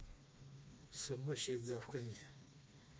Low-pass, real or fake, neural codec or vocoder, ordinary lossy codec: none; fake; codec, 16 kHz, 2 kbps, FreqCodec, smaller model; none